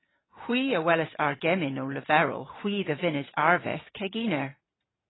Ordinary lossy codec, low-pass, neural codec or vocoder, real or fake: AAC, 16 kbps; 7.2 kHz; none; real